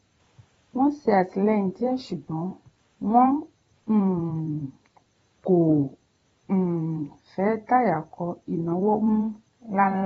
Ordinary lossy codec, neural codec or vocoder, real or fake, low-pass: AAC, 24 kbps; vocoder, 44.1 kHz, 128 mel bands every 512 samples, BigVGAN v2; fake; 19.8 kHz